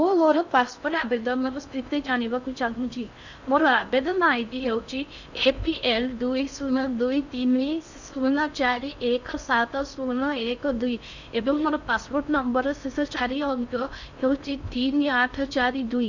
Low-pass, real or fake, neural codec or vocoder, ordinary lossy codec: 7.2 kHz; fake; codec, 16 kHz in and 24 kHz out, 0.6 kbps, FocalCodec, streaming, 4096 codes; none